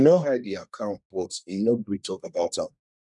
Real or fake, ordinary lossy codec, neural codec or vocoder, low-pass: fake; none; codec, 24 kHz, 1 kbps, SNAC; 10.8 kHz